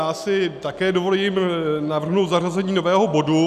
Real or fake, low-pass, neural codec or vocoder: real; 14.4 kHz; none